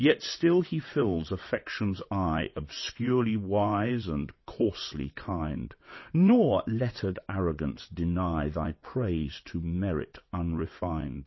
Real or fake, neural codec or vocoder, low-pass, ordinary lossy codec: fake; vocoder, 22.05 kHz, 80 mel bands, WaveNeXt; 7.2 kHz; MP3, 24 kbps